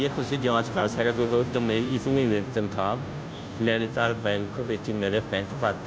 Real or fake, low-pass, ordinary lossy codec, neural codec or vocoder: fake; none; none; codec, 16 kHz, 0.5 kbps, FunCodec, trained on Chinese and English, 25 frames a second